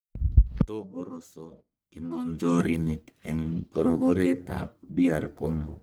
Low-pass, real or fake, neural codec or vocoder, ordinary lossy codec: none; fake; codec, 44.1 kHz, 1.7 kbps, Pupu-Codec; none